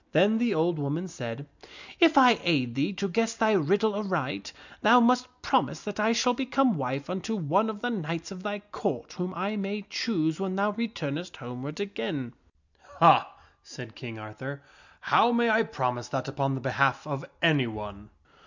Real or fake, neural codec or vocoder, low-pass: real; none; 7.2 kHz